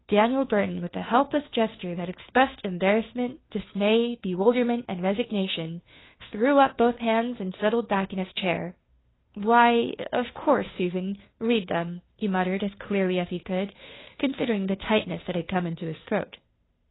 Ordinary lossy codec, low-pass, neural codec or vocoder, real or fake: AAC, 16 kbps; 7.2 kHz; codec, 16 kHz, 2 kbps, FunCodec, trained on Chinese and English, 25 frames a second; fake